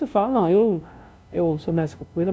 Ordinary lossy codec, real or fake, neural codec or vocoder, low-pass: none; fake; codec, 16 kHz, 0.5 kbps, FunCodec, trained on LibriTTS, 25 frames a second; none